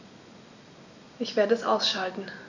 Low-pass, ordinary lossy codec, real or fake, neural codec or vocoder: 7.2 kHz; none; real; none